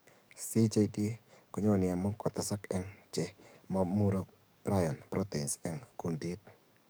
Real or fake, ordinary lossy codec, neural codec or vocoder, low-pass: fake; none; codec, 44.1 kHz, 7.8 kbps, DAC; none